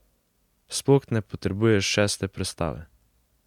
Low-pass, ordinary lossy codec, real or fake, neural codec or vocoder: 19.8 kHz; MP3, 96 kbps; real; none